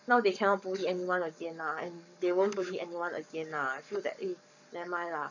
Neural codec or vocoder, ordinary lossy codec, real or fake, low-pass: codec, 44.1 kHz, 7.8 kbps, Pupu-Codec; none; fake; 7.2 kHz